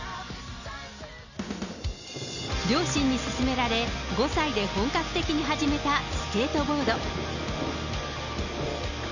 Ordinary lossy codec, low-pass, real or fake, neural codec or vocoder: none; 7.2 kHz; real; none